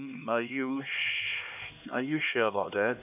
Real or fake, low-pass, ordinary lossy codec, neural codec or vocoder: fake; 3.6 kHz; none; codec, 16 kHz, 2 kbps, X-Codec, HuBERT features, trained on LibriSpeech